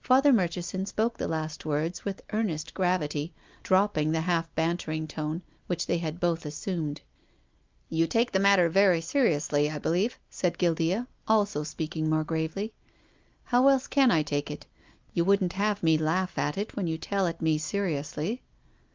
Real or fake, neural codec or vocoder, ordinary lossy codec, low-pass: real; none; Opus, 24 kbps; 7.2 kHz